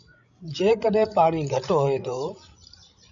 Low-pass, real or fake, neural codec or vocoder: 7.2 kHz; fake; codec, 16 kHz, 16 kbps, FreqCodec, larger model